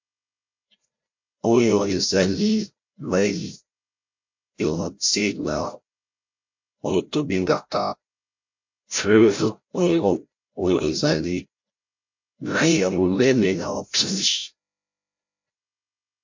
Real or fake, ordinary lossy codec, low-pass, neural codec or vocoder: fake; MP3, 48 kbps; 7.2 kHz; codec, 16 kHz, 0.5 kbps, FreqCodec, larger model